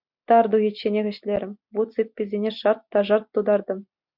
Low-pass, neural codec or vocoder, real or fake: 5.4 kHz; none; real